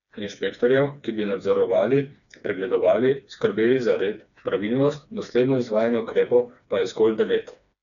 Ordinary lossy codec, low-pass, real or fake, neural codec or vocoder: none; 7.2 kHz; fake; codec, 16 kHz, 2 kbps, FreqCodec, smaller model